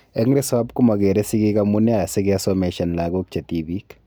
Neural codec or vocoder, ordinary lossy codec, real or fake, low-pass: none; none; real; none